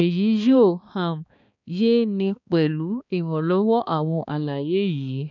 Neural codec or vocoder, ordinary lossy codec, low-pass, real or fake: codec, 16 kHz, 2 kbps, X-Codec, HuBERT features, trained on balanced general audio; none; 7.2 kHz; fake